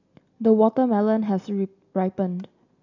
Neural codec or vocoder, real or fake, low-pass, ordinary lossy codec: none; real; 7.2 kHz; none